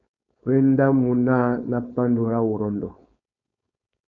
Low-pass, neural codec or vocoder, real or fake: 7.2 kHz; codec, 16 kHz, 4.8 kbps, FACodec; fake